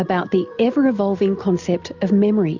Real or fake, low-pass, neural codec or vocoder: real; 7.2 kHz; none